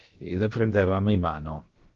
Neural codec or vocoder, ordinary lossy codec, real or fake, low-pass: codec, 16 kHz, about 1 kbps, DyCAST, with the encoder's durations; Opus, 16 kbps; fake; 7.2 kHz